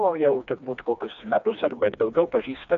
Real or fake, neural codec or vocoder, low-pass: fake; codec, 16 kHz, 2 kbps, FreqCodec, smaller model; 7.2 kHz